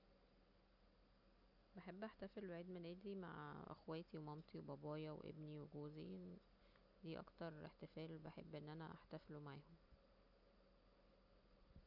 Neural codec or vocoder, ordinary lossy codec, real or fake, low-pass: none; none; real; 5.4 kHz